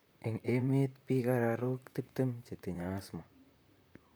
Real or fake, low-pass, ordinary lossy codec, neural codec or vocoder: fake; none; none; vocoder, 44.1 kHz, 128 mel bands, Pupu-Vocoder